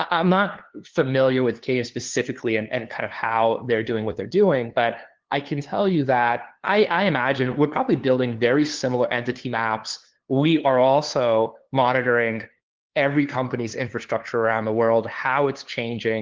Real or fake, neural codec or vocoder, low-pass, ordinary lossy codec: fake; codec, 16 kHz, 2 kbps, FunCodec, trained on LibriTTS, 25 frames a second; 7.2 kHz; Opus, 16 kbps